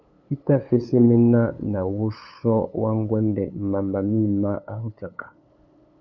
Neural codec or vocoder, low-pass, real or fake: codec, 16 kHz, 8 kbps, FunCodec, trained on LibriTTS, 25 frames a second; 7.2 kHz; fake